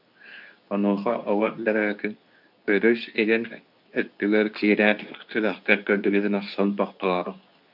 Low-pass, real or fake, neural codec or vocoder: 5.4 kHz; fake; codec, 24 kHz, 0.9 kbps, WavTokenizer, medium speech release version 2